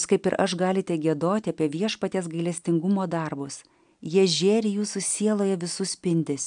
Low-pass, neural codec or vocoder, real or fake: 9.9 kHz; none; real